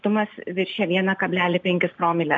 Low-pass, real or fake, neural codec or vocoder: 7.2 kHz; real; none